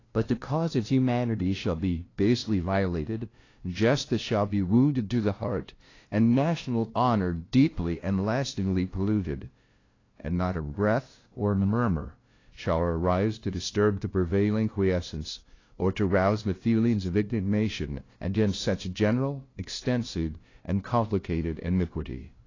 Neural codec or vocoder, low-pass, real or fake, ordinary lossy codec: codec, 16 kHz, 0.5 kbps, FunCodec, trained on LibriTTS, 25 frames a second; 7.2 kHz; fake; AAC, 32 kbps